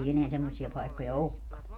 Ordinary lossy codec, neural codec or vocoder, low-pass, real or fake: none; vocoder, 48 kHz, 128 mel bands, Vocos; 19.8 kHz; fake